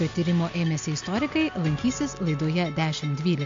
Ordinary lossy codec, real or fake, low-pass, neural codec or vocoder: MP3, 48 kbps; real; 7.2 kHz; none